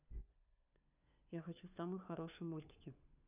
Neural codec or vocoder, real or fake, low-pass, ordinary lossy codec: codec, 16 kHz, 4 kbps, FunCodec, trained on LibriTTS, 50 frames a second; fake; 3.6 kHz; none